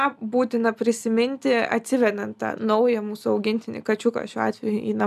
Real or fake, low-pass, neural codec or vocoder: real; 14.4 kHz; none